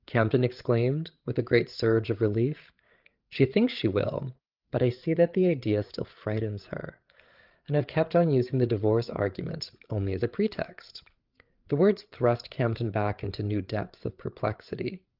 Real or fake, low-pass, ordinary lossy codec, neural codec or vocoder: fake; 5.4 kHz; Opus, 32 kbps; codec, 16 kHz, 8 kbps, FreqCodec, larger model